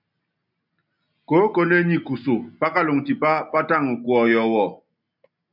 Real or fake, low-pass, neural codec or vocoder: real; 5.4 kHz; none